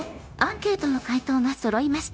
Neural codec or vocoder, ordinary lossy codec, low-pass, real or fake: codec, 16 kHz, 0.9 kbps, LongCat-Audio-Codec; none; none; fake